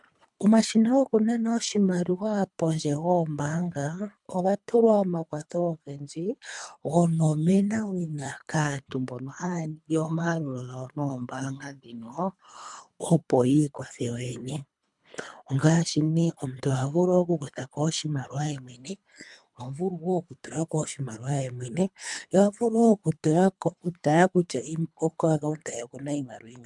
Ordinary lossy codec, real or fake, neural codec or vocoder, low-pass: MP3, 96 kbps; fake; codec, 24 kHz, 3 kbps, HILCodec; 10.8 kHz